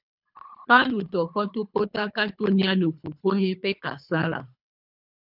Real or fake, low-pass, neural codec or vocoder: fake; 5.4 kHz; codec, 24 kHz, 3 kbps, HILCodec